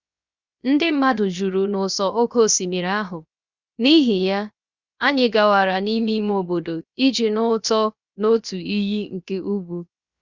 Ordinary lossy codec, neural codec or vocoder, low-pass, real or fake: Opus, 64 kbps; codec, 16 kHz, 0.7 kbps, FocalCodec; 7.2 kHz; fake